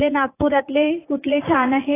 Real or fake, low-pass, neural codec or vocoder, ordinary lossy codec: fake; 3.6 kHz; vocoder, 44.1 kHz, 80 mel bands, Vocos; AAC, 16 kbps